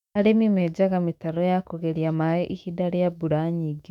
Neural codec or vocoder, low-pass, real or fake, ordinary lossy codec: autoencoder, 48 kHz, 128 numbers a frame, DAC-VAE, trained on Japanese speech; 19.8 kHz; fake; none